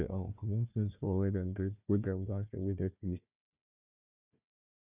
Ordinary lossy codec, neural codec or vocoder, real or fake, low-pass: none; codec, 16 kHz, 1 kbps, FunCodec, trained on Chinese and English, 50 frames a second; fake; 3.6 kHz